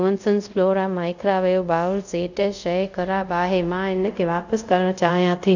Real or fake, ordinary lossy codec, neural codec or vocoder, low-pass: fake; none; codec, 24 kHz, 0.5 kbps, DualCodec; 7.2 kHz